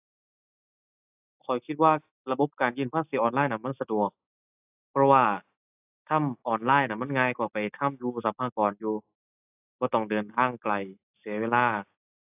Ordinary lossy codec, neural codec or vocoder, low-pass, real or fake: AAC, 32 kbps; none; 3.6 kHz; real